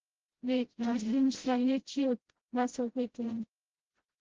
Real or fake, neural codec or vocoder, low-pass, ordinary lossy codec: fake; codec, 16 kHz, 0.5 kbps, FreqCodec, smaller model; 7.2 kHz; Opus, 16 kbps